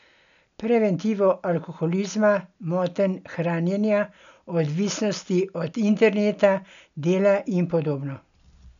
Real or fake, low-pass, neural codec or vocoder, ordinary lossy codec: real; 7.2 kHz; none; none